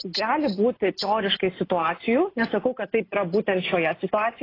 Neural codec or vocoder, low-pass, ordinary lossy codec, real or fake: none; 5.4 kHz; AAC, 24 kbps; real